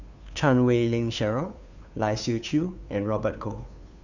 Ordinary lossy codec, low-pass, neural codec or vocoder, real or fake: none; 7.2 kHz; codec, 16 kHz, 2 kbps, FunCodec, trained on Chinese and English, 25 frames a second; fake